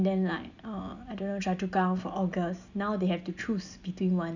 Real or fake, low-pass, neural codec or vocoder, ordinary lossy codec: real; 7.2 kHz; none; none